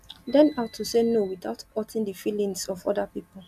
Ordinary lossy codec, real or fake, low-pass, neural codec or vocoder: AAC, 96 kbps; real; 14.4 kHz; none